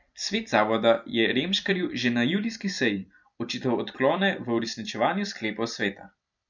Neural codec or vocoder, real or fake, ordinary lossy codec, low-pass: vocoder, 44.1 kHz, 128 mel bands every 256 samples, BigVGAN v2; fake; none; 7.2 kHz